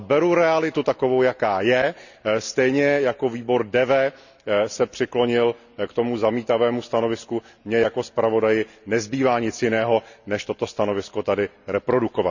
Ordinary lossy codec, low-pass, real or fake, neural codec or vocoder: none; 7.2 kHz; real; none